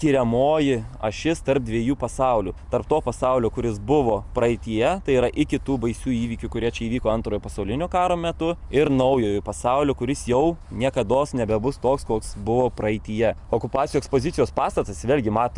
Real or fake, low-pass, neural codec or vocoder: real; 10.8 kHz; none